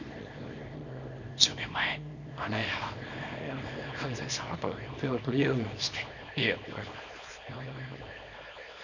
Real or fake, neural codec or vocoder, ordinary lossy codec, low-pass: fake; codec, 24 kHz, 0.9 kbps, WavTokenizer, small release; none; 7.2 kHz